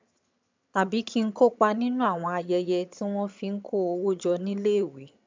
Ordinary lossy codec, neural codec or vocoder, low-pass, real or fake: MP3, 64 kbps; vocoder, 22.05 kHz, 80 mel bands, HiFi-GAN; 7.2 kHz; fake